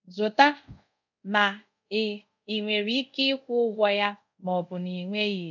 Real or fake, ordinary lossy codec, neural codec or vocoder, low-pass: fake; none; codec, 24 kHz, 0.5 kbps, DualCodec; 7.2 kHz